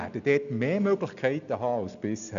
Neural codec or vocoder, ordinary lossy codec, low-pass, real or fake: none; none; 7.2 kHz; real